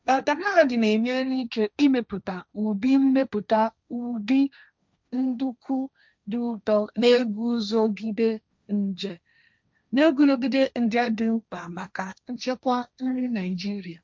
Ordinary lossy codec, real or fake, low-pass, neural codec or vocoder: none; fake; none; codec, 16 kHz, 1.1 kbps, Voila-Tokenizer